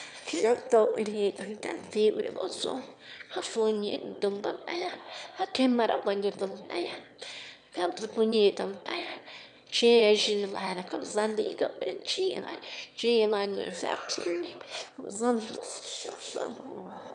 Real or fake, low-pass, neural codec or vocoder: fake; 9.9 kHz; autoencoder, 22.05 kHz, a latent of 192 numbers a frame, VITS, trained on one speaker